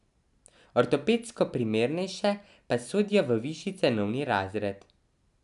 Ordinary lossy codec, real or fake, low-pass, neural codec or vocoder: none; real; 10.8 kHz; none